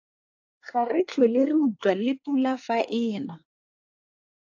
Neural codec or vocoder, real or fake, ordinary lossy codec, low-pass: codec, 24 kHz, 1 kbps, SNAC; fake; AAC, 48 kbps; 7.2 kHz